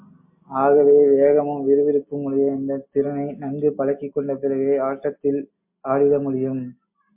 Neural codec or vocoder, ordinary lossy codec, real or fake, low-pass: none; MP3, 32 kbps; real; 3.6 kHz